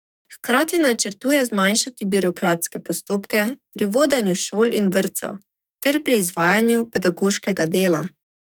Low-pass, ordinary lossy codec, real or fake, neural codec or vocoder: none; none; fake; codec, 44.1 kHz, 2.6 kbps, SNAC